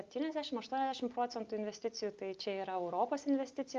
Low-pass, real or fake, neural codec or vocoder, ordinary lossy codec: 7.2 kHz; real; none; Opus, 32 kbps